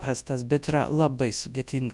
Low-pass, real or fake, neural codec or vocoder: 10.8 kHz; fake; codec, 24 kHz, 0.9 kbps, WavTokenizer, large speech release